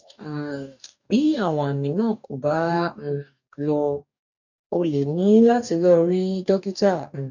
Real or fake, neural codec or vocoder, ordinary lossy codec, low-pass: fake; codec, 44.1 kHz, 2.6 kbps, DAC; none; 7.2 kHz